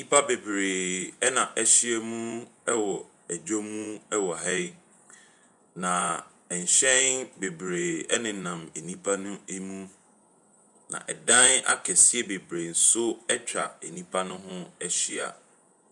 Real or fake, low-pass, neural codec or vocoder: fake; 10.8 kHz; vocoder, 24 kHz, 100 mel bands, Vocos